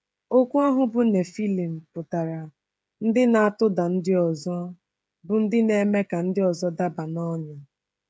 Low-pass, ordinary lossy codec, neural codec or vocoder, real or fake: none; none; codec, 16 kHz, 16 kbps, FreqCodec, smaller model; fake